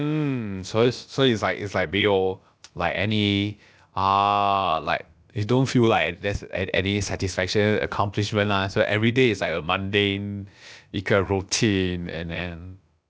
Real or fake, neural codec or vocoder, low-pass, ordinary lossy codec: fake; codec, 16 kHz, about 1 kbps, DyCAST, with the encoder's durations; none; none